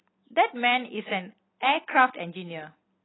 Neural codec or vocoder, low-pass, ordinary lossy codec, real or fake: none; 7.2 kHz; AAC, 16 kbps; real